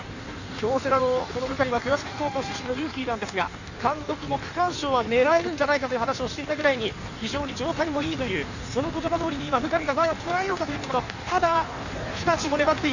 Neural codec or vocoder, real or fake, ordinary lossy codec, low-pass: codec, 16 kHz in and 24 kHz out, 1.1 kbps, FireRedTTS-2 codec; fake; none; 7.2 kHz